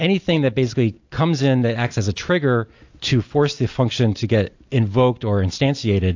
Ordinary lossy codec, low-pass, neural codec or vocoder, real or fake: AAC, 48 kbps; 7.2 kHz; none; real